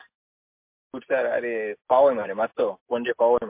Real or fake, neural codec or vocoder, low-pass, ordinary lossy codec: real; none; 3.6 kHz; MP3, 32 kbps